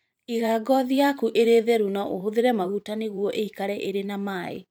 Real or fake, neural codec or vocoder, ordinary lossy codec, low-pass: fake; vocoder, 44.1 kHz, 128 mel bands every 512 samples, BigVGAN v2; none; none